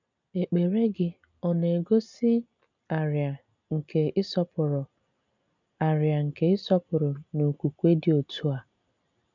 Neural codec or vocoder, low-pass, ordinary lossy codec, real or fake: none; 7.2 kHz; none; real